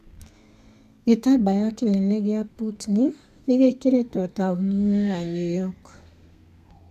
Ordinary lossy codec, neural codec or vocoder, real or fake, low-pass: none; codec, 32 kHz, 1.9 kbps, SNAC; fake; 14.4 kHz